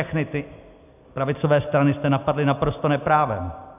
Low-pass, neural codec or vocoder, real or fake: 3.6 kHz; none; real